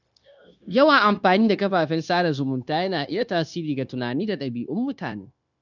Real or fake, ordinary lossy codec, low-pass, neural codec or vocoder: fake; none; 7.2 kHz; codec, 16 kHz, 0.9 kbps, LongCat-Audio-Codec